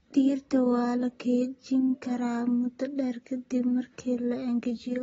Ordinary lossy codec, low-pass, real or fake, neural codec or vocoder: AAC, 24 kbps; 19.8 kHz; real; none